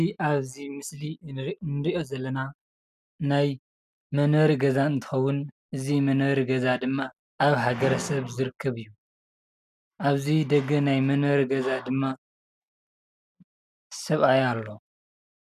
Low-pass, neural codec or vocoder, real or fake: 14.4 kHz; none; real